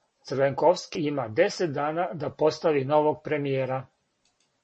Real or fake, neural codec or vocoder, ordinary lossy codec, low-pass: real; none; MP3, 32 kbps; 9.9 kHz